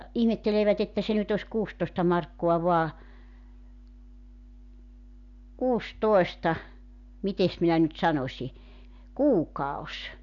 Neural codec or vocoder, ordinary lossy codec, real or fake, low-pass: none; MP3, 96 kbps; real; 7.2 kHz